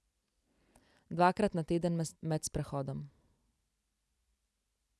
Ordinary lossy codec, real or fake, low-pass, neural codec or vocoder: none; real; none; none